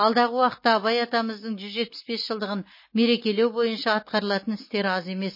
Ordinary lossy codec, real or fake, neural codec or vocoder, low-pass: MP3, 24 kbps; real; none; 5.4 kHz